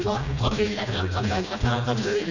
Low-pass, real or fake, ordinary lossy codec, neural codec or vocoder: 7.2 kHz; fake; none; codec, 16 kHz, 1 kbps, FreqCodec, smaller model